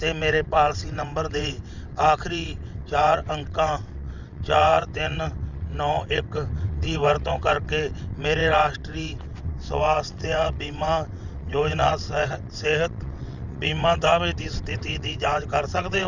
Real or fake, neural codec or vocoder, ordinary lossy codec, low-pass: fake; vocoder, 22.05 kHz, 80 mel bands, WaveNeXt; none; 7.2 kHz